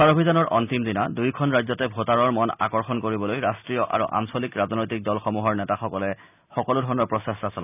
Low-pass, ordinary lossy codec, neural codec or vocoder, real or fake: 3.6 kHz; none; none; real